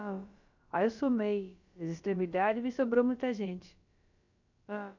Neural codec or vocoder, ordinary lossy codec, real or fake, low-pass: codec, 16 kHz, about 1 kbps, DyCAST, with the encoder's durations; none; fake; 7.2 kHz